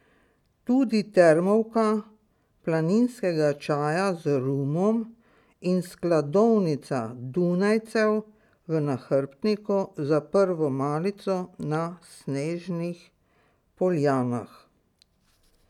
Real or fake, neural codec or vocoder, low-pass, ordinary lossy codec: real; none; 19.8 kHz; none